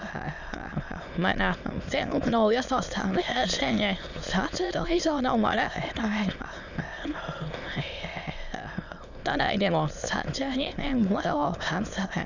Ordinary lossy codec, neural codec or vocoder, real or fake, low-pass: none; autoencoder, 22.05 kHz, a latent of 192 numbers a frame, VITS, trained on many speakers; fake; 7.2 kHz